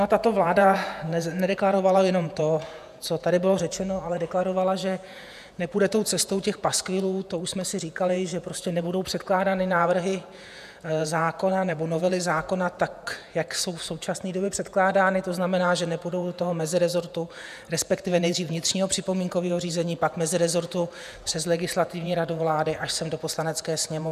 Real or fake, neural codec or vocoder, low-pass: fake; vocoder, 48 kHz, 128 mel bands, Vocos; 14.4 kHz